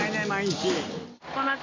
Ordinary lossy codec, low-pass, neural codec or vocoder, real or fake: MP3, 32 kbps; 7.2 kHz; none; real